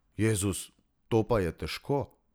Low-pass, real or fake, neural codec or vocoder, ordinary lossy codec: none; real; none; none